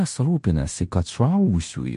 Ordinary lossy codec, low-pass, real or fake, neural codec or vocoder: MP3, 48 kbps; 10.8 kHz; fake; codec, 16 kHz in and 24 kHz out, 0.9 kbps, LongCat-Audio-Codec, fine tuned four codebook decoder